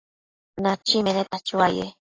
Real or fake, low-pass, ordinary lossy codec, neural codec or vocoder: real; 7.2 kHz; AAC, 32 kbps; none